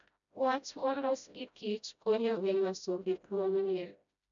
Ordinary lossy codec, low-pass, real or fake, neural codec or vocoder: none; 7.2 kHz; fake; codec, 16 kHz, 0.5 kbps, FreqCodec, smaller model